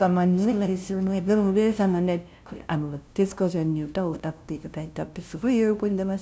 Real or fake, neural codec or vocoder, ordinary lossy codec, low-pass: fake; codec, 16 kHz, 0.5 kbps, FunCodec, trained on LibriTTS, 25 frames a second; none; none